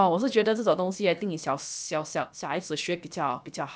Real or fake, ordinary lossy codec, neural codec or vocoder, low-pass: fake; none; codec, 16 kHz, about 1 kbps, DyCAST, with the encoder's durations; none